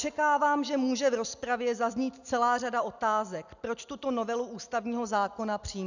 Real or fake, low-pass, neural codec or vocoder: real; 7.2 kHz; none